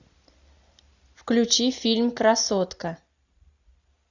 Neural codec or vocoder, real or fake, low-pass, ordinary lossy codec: none; real; 7.2 kHz; Opus, 64 kbps